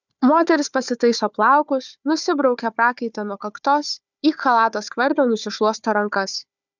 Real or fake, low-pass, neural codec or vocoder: fake; 7.2 kHz; codec, 16 kHz, 4 kbps, FunCodec, trained on Chinese and English, 50 frames a second